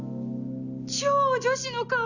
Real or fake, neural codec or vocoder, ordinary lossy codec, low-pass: real; none; none; 7.2 kHz